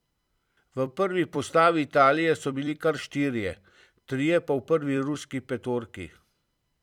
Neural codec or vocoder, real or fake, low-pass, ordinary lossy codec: vocoder, 44.1 kHz, 128 mel bands every 256 samples, BigVGAN v2; fake; 19.8 kHz; none